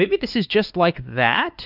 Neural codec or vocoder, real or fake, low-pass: codec, 16 kHz, 2 kbps, X-Codec, WavLM features, trained on Multilingual LibriSpeech; fake; 5.4 kHz